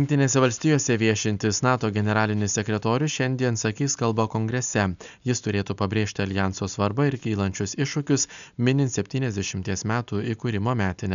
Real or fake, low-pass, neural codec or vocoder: real; 7.2 kHz; none